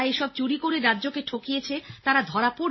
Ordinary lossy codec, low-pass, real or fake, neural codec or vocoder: MP3, 24 kbps; 7.2 kHz; real; none